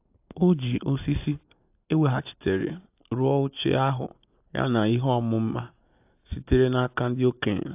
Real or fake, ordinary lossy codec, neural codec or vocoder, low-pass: real; AAC, 32 kbps; none; 3.6 kHz